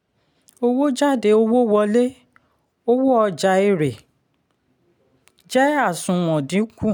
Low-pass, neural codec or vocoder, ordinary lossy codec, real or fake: 19.8 kHz; none; none; real